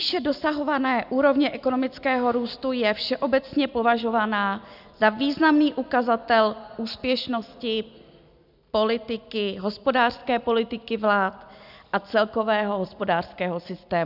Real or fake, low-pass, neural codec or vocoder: real; 5.4 kHz; none